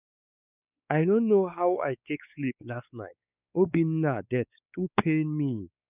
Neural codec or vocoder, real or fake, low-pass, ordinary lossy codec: codec, 16 kHz, 4 kbps, X-Codec, WavLM features, trained on Multilingual LibriSpeech; fake; 3.6 kHz; none